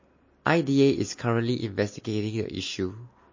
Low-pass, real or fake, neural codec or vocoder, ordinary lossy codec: 7.2 kHz; real; none; MP3, 32 kbps